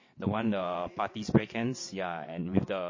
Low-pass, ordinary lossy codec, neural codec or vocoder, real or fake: 7.2 kHz; MP3, 32 kbps; vocoder, 22.05 kHz, 80 mel bands, WaveNeXt; fake